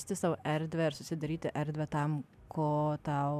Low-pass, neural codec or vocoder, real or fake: 14.4 kHz; none; real